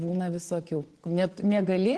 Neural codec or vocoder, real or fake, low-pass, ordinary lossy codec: vocoder, 24 kHz, 100 mel bands, Vocos; fake; 10.8 kHz; Opus, 16 kbps